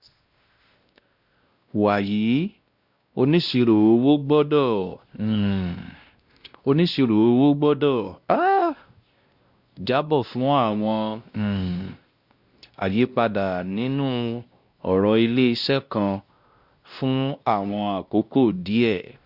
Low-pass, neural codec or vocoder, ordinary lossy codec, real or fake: 5.4 kHz; codec, 16 kHz, 1 kbps, X-Codec, WavLM features, trained on Multilingual LibriSpeech; Opus, 64 kbps; fake